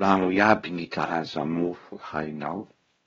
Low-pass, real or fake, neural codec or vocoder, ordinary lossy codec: 7.2 kHz; fake; codec, 16 kHz, 1 kbps, X-Codec, WavLM features, trained on Multilingual LibriSpeech; AAC, 24 kbps